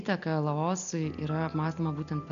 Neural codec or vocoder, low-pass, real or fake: none; 7.2 kHz; real